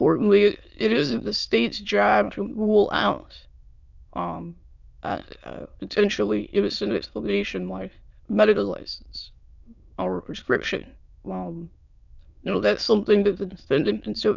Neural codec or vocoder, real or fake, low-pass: autoencoder, 22.05 kHz, a latent of 192 numbers a frame, VITS, trained on many speakers; fake; 7.2 kHz